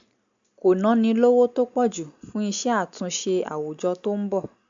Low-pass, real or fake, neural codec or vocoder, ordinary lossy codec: 7.2 kHz; real; none; AAC, 64 kbps